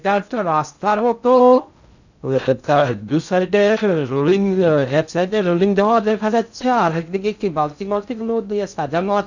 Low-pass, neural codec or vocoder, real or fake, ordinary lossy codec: 7.2 kHz; codec, 16 kHz in and 24 kHz out, 0.6 kbps, FocalCodec, streaming, 2048 codes; fake; none